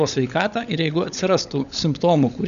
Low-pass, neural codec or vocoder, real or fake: 7.2 kHz; codec, 16 kHz, 16 kbps, FunCodec, trained on LibriTTS, 50 frames a second; fake